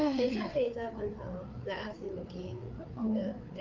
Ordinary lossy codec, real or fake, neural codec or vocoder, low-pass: Opus, 24 kbps; fake; codec, 16 kHz, 4 kbps, FreqCodec, larger model; 7.2 kHz